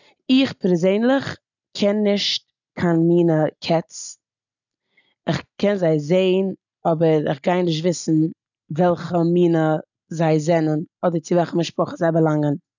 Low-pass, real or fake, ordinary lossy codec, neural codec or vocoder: 7.2 kHz; real; none; none